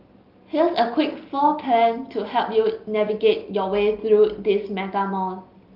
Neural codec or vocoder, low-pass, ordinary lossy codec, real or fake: none; 5.4 kHz; Opus, 32 kbps; real